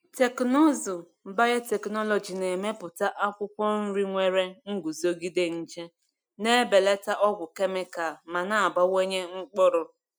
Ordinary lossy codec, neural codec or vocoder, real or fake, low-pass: none; none; real; none